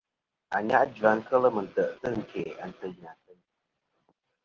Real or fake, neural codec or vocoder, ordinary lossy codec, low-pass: real; none; Opus, 16 kbps; 7.2 kHz